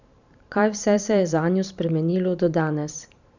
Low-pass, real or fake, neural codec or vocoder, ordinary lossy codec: 7.2 kHz; fake; vocoder, 44.1 kHz, 128 mel bands every 512 samples, BigVGAN v2; none